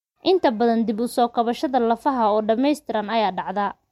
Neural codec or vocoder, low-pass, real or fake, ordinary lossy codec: none; 19.8 kHz; real; MP3, 64 kbps